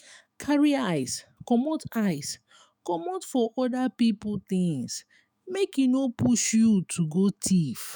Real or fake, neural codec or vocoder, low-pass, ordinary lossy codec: fake; autoencoder, 48 kHz, 128 numbers a frame, DAC-VAE, trained on Japanese speech; none; none